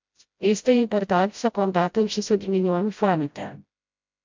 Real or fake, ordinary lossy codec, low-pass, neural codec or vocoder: fake; MP3, 64 kbps; 7.2 kHz; codec, 16 kHz, 0.5 kbps, FreqCodec, smaller model